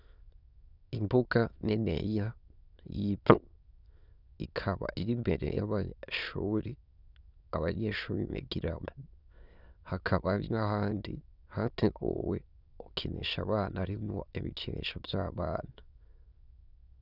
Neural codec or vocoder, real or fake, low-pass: autoencoder, 22.05 kHz, a latent of 192 numbers a frame, VITS, trained on many speakers; fake; 5.4 kHz